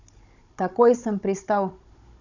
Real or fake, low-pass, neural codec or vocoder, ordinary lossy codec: fake; 7.2 kHz; codec, 16 kHz, 16 kbps, FunCodec, trained on Chinese and English, 50 frames a second; none